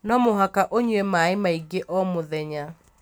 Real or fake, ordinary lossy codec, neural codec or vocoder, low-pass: real; none; none; none